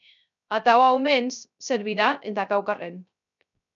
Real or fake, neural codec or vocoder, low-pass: fake; codec, 16 kHz, 0.3 kbps, FocalCodec; 7.2 kHz